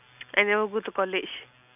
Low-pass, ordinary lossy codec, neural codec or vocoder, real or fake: 3.6 kHz; none; none; real